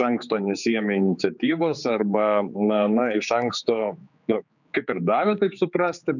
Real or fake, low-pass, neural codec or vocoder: fake; 7.2 kHz; codec, 16 kHz, 4 kbps, X-Codec, HuBERT features, trained on general audio